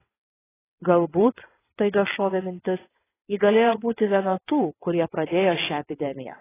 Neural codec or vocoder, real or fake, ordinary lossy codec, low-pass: vocoder, 22.05 kHz, 80 mel bands, WaveNeXt; fake; AAC, 16 kbps; 3.6 kHz